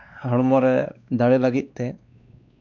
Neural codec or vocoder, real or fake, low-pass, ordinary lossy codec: codec, 16 kHz, 2 kbps, X-Codec, WavLM features, trained on Multilingual LibriSpeech; fake; 7.2 kHz; none